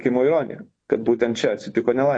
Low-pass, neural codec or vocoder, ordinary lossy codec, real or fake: 9.9 kHz; vocoder, 24 kHz, 100 mel bands, Vocos; AAC, 48 kbps; fake